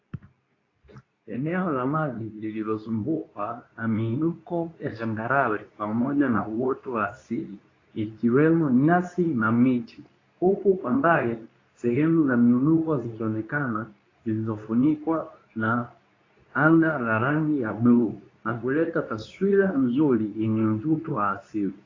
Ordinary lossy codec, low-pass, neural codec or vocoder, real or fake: AAC, 32 kbps; 7.2 kHz; codec, 24 kHz, 0.9 kbps, WavTokenizer, medium speech release version 2; fake